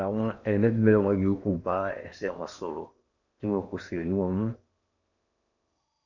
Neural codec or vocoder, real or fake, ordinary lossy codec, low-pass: codec, 16 kHz in and 24 kHz out, 0.8 kbps, FocalCodec, streaming, 65536 codes; fake; none; 7.2 kHz